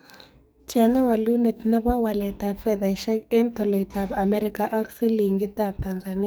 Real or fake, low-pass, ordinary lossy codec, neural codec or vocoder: fake; none; none; codec, 44.1 kHz, 2.6 kbps, SNAC